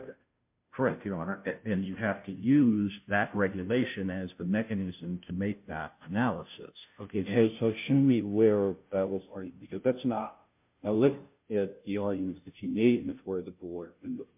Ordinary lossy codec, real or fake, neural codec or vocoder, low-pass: MP3, 32 kbps; fake; codec, 16 kHz, 0.5 kbps, FunCodec, trained on Chinese and English, 25 frames a second; 3.6 kHz